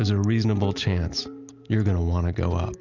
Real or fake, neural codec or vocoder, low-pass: real; none; 7.2 kHz